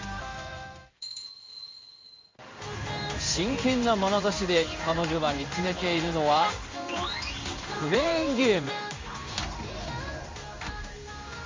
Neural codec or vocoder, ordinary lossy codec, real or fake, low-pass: codec, 16 kHz in and 24 kHz out, 1 kbps, XY-Tokenizer; MP3, 48 kbps; fake; 7.2 kHz